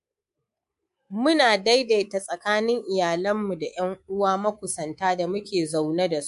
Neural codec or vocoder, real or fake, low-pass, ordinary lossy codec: codec, 24 kHz, 3.1 kbps, DualCodec; fake; 10.8 kHz; MP3, 64 kbps